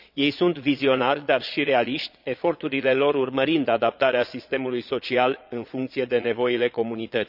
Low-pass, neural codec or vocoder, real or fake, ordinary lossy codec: 5.4 kHz; vocoder, 22.05 kHz, 80 mel bands, Vocos; fake; none